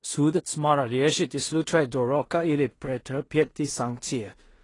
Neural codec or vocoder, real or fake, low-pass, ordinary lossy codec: codec, 16 kHz in and 24 kHz out, 0.4 kbps, LongCat-Audio-Codec, two codebook decoder; fake; 10.8 kHz; AAC, 32 kbps